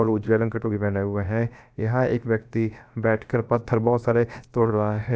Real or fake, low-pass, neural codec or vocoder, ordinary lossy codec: fake; none; codec, 16 kHz, about 1 kbps, DyCAST, with the encoder's durations; none